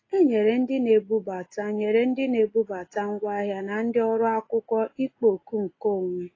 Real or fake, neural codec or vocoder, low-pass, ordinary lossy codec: real; none; 7.2 kHz; AAC, 32 kbps